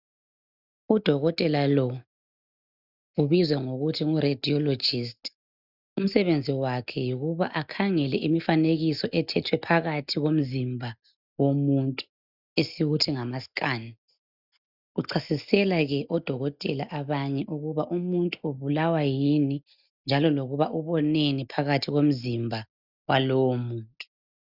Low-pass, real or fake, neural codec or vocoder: 5.4 kHz; real; none